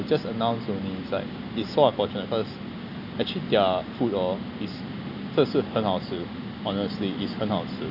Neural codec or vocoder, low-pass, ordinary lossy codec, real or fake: none; 5.4 kHz; none; real